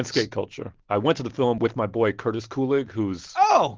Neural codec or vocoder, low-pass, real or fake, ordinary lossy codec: none; 7.2 kHz; real; Opus, 16 kbps